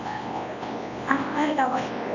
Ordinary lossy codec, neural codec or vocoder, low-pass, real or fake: none; codec, 24 kHz, 0.9 kbps, WavTokenizer, large speech release; 7.2 kHz; fake